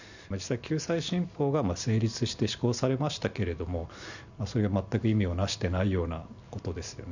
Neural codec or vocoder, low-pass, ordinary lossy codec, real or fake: none; 7.2 kHz; none; real